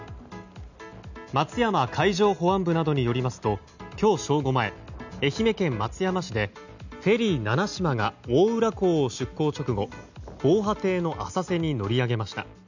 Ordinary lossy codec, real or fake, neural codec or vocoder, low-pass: none; real; none; 7.2 kHz